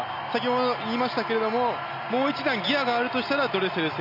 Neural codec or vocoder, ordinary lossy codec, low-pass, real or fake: none; none; 5.4 kHz; real